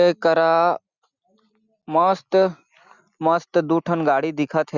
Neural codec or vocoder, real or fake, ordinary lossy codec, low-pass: none; real; Opus, 64 kbps; 7.2 kHz